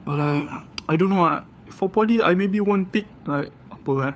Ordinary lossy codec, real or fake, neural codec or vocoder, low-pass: none; fake; codec, 16 kHz, 4 kbps, FunCodec, trained on LibriTTS, 50 frames a second; none